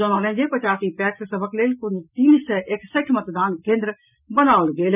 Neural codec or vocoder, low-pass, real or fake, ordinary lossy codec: none; 3.6 kHz; real; none